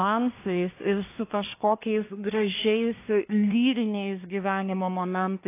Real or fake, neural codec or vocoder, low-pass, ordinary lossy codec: fake; codec, 24 kHz, 1 kbps, SNAC; 3.6 kHz; AAC, 24 kbps